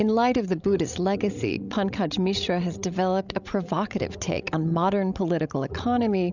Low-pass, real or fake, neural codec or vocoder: 7.2 kHz; fake; codec, 16 kHz, 16 kbps, FreqCodec, larger model